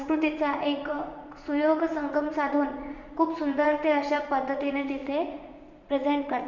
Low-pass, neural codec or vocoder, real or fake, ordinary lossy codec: 7.2 kHz; vocoder, 44.1 kHz, 80 mel bands, Vocos; fake; none